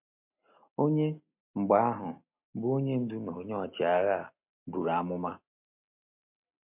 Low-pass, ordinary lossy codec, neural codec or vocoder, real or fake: 3.6 kHz; MP3, 32 kbps; none; real